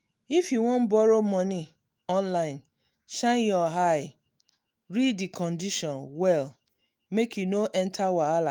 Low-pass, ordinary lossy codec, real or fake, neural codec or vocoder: 19.8 kHz; Opus, 32 kbps; fake; autoencoder, 48 kHz, 128 numbers a frame, DAC-VAE, trained on Japanese speech